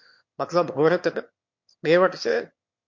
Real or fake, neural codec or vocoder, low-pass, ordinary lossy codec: fake; autoencoder, 22.05 kHz, a latent of 192 numbers a frame, VITS, trained on one speaker; 7.2 kHz; MP3, 48 kbps